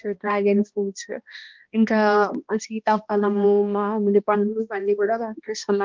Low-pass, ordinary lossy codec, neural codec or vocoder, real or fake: 7.2 kHz; Opus, 24 kbps; codec, 16 kHz, 1 kbps, X-Codec, HuBERT features, trained on balanced general audio; fake